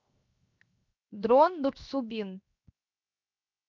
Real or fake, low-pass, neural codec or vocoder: fake; 7.2 kHz; codec, 16 kHz, 0.7 kbps, FocalCodec